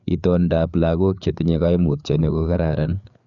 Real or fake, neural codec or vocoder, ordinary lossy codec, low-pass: fake; codec, 16 kHz, 4 kbps, FreqCodec, larger model; none; 7.2 kHz